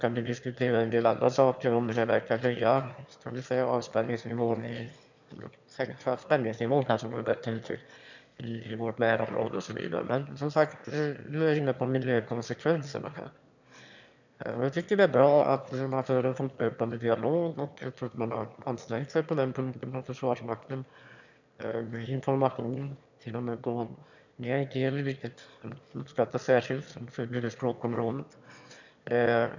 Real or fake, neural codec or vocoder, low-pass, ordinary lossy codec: fake; autoencoder, 22.05 kHz, a latent of 192 numbers a frame, VITS, trained on one speaker; 7.2 kHz; none